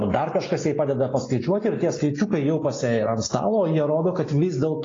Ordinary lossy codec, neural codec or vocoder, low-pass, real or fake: AAC, 32 kbps; none; 7.2 kHz; real